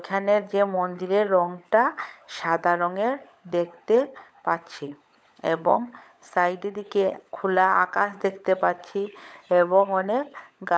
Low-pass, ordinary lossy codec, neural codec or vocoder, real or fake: none; none; codec, 16 kHz, 16 kbps, FunCodec, trained on LibriTTS, 50 frames a second; fake